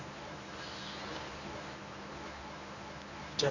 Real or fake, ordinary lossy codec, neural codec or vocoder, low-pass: fake; none; codec, 24 kHz, 0.9 kbps, WavTokenizer, medium speech release version 1; 7.2 kHz